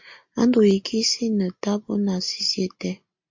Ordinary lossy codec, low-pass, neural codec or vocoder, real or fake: MP3, 48 kbps; 7.2 kHz; none; real